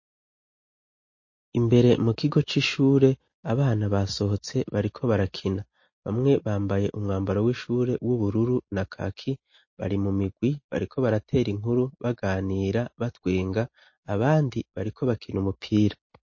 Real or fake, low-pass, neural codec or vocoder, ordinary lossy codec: real; 7.2 kHz; none; MP3, 32 kbps